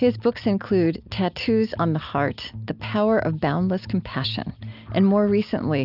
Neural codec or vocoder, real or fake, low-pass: none; real; 5.4 kHz